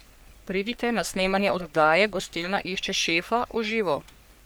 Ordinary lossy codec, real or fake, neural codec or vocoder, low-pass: none; fake; codec, 44.1 kHz, 3.4 kbps, Pupu-Codec; none